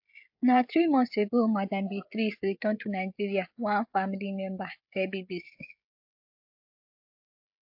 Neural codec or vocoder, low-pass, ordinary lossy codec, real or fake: codec, 16 kHz, 16 kbps, FreqCodec, smaller model; 5.4 kHz; AAC, 48 kbps; fake